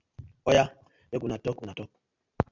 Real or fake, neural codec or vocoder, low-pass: real; none; 7.2 kHz